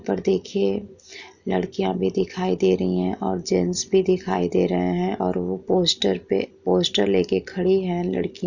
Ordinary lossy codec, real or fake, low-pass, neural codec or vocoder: none; real; 7.2 kHz; none